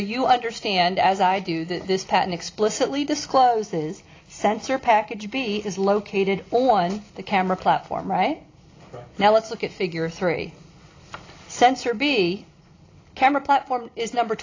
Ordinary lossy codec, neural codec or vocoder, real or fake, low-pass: AAC, 32 kbps; none; real; 7.2 kHz